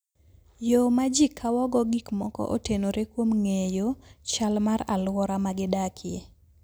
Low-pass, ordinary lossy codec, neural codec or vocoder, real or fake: none; none; none; real